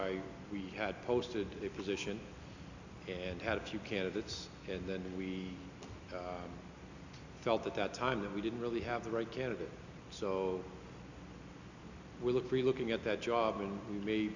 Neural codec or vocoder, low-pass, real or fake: none; 7.2 kHz; real